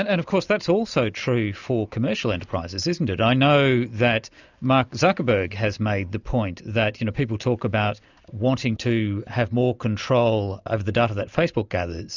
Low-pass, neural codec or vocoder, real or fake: 7.2 kHz; none; real